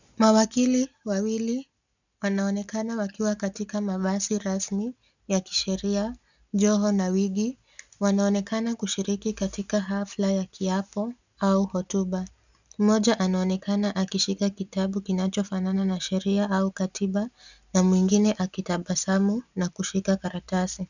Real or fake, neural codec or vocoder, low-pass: real; none; 7.2 kHz